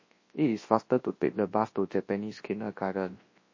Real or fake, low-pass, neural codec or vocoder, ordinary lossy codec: fake; 7.2 kHz; codec, 24 kHz, 0.9 kbps, WavTokenizer, large speech release; MP3, 32 kbps